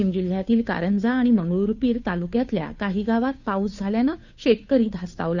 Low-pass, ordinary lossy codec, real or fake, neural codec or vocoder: 7.2 kHz; none; fake; codec, 16 kHz, 2 kbps, FunCodec, trained on Chinese and English, 25 frames a second